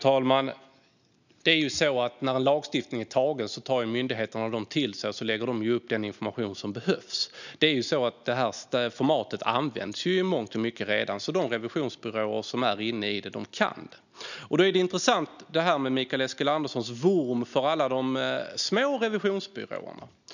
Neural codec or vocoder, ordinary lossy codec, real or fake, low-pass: none; none; real; 7.2 kHz